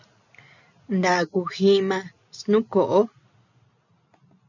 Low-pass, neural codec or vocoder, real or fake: 7.2 kHz; none; real